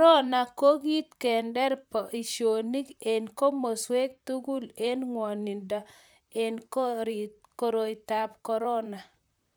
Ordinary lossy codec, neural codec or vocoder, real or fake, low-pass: none; vocoder, 44.1 kHz, 128 mel bands, Pupu-Vocoder; fake; none